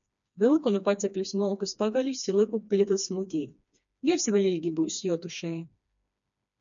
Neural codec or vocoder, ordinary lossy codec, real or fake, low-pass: codec, 16 kHz, 2 kbps, FreqCodec, smaller model; MP3, 96 kbps; fake; 7.2 kHz